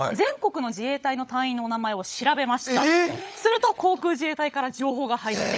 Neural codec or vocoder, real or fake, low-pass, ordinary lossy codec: codec, 16 kHz, 16 kbps, FunCodec, trained on LibriTTS, 50 frames a second; fake; none; none